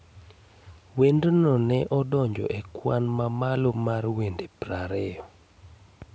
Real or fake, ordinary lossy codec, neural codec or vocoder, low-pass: real; none; none; none